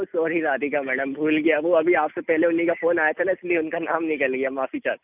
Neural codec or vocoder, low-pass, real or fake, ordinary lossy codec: none; 3.6 kHz; real; none